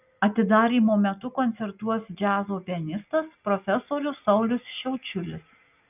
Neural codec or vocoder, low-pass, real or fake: none; 3.6 kHz; real